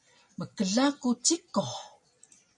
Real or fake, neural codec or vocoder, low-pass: real; none; 10.8 kHz